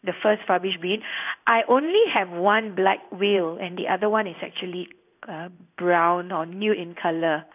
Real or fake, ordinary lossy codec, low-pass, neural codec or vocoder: fake; none; 3.6 kHz; codec, 16 kHz in and 24 kHz out, 1 kbps, XY-Tokenizer